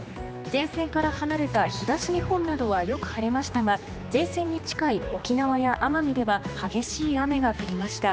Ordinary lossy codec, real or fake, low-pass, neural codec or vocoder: none; fake; none; codec, 16 kHz, 2 kbps, X-Codec, HuBERT features, trained on general audio